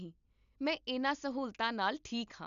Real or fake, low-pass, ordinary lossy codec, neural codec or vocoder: real; 7.2 kHz; none; none